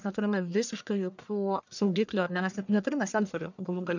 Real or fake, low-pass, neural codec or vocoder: fake; 7.2 kHz; codec, 44.1 kHz, 1.7 kbps, Pupu-Codec